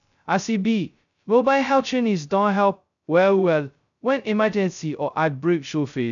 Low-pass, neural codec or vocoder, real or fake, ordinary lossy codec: 7.2 kHz; codec, 16 kHz, 0.2 kbps, FocalCodec; fake; none